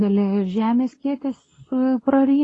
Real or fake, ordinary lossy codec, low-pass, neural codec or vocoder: fake; AAC, 32 kbps; 10.8 kHz; codec, 24 kHz, 0.9 kbps, WavTokenizer, medium speech release version 2